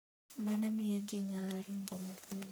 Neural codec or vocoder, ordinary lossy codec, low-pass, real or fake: codec, 44.1 kHz, 1.7 kbps, Pupu-Codec; none; none; fake